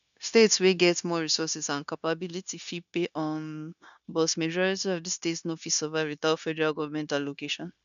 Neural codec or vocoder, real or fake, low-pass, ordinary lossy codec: codec, 16 kHz, 0.9 kbps, LongCat-Audio-Codec; fake; 7.2 kHz; none